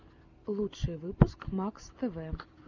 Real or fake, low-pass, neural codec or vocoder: real; 7.2 kHz; none